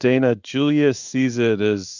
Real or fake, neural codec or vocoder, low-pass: fake; codec, 16 kHz in and 24 kHz out, 1 kbps, XY-Tokenizer; 7.2 kHz